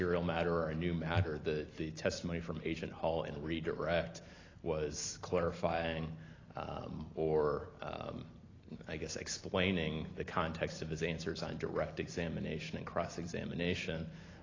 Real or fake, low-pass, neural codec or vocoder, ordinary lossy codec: real; 7.2 kHz; none; AAC, 32 kbps